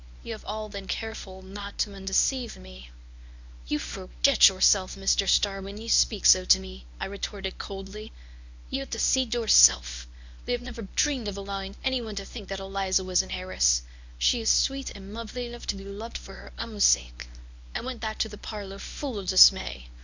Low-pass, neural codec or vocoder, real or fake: 7.2 kHz; codec, 24 kHz, 0.9 kbps, WavTokenizer, medium speech release version 1; fake